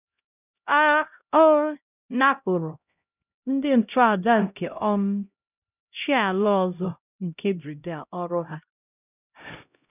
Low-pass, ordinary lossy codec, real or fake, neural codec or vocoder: 3.6 kHz; none; fake; codec, 16 kHz, 0.5 kbps, X-Codec, HuBERT features, trained on LibriSpeech